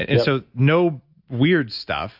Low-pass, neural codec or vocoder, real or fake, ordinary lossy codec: 5.4 kHz; none; real; AAC, 48 kbps